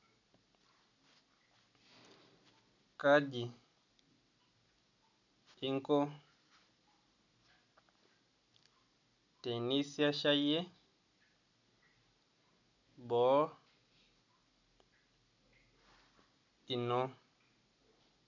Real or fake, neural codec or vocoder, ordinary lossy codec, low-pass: real; none; none; 7.2 kHz